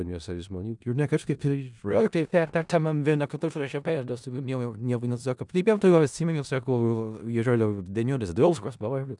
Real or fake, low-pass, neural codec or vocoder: fake; 10.8 kHz; codec, 16 kHz in and 24 kHz out, 0.4 kbps, LongCat-Audio-Codec, four codebook decoder